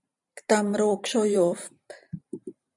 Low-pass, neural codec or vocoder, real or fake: 10.8 kHz; vocoder, 44.1 kHz, 128 mel bands every 512 samples, BigVGAN v2; fake